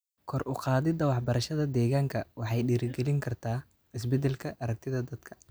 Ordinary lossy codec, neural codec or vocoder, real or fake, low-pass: none; none; real; none